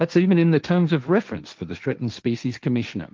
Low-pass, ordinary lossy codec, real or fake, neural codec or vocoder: 7.2 kHz; Opus, 24 kbps; fake; codec, 16 kHz, 1.1 kbps, Voila-Tokenizer